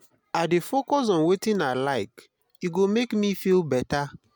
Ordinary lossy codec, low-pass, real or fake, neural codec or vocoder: none; none; real; none